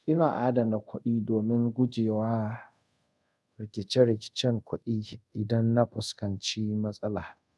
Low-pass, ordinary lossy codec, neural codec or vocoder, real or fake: none; none; codec, 24 kHz, 0.5 kbps, DualCodec; fake